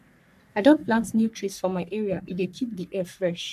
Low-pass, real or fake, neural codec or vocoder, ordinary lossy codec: 14.4 kHz; fake; codec, 44.1 kHz, 3.4 kbps, Pupu-Codec; none